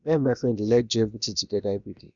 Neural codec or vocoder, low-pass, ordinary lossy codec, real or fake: codec, 16 kHz, about 1 kbps, DyCAST, with the encoder's durations; 7.2 kHz; none; fake